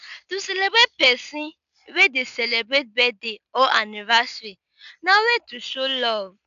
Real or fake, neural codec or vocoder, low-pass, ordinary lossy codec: real; none; 7.2 kHz; none